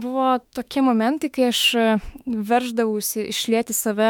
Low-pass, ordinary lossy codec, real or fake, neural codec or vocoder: 19.8 kHz; MP3, 96 kbps; fake; autoencoder, 48 kHz, 32 numbers a frame, DAC-VAE, trained on Japanese speech